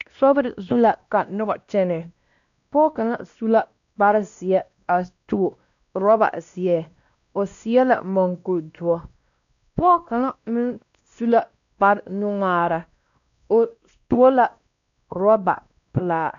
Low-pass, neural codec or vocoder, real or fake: 7.2 kHz; codec, 16 kHz, 1 kbps, X-Codec, WavLM features, trained on Multilingual LibriSpeech; fake